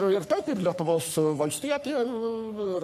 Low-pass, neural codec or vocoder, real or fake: 14.4 kHz; codec, 44.1 kHz, 3.4 kbps, Pupu-Codec; fake